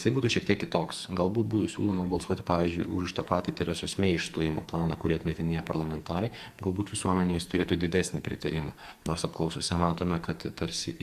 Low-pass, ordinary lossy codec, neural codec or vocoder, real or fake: 14.4 kHz; Opus, 64 kbps; codec, 44.1 kHz, 2.6 kbps, SNAC; fake